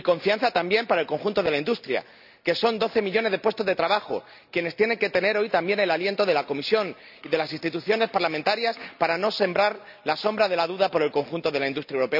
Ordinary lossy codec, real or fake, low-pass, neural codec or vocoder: none; real; 5.4 kHz; none